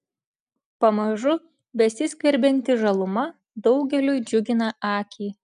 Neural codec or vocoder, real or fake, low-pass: none; real; 10.8 kHz